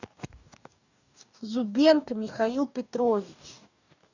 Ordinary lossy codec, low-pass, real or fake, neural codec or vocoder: none; 7.2 kHz; fake; codec, 44.1 kHz, 2.6 kbps, DAC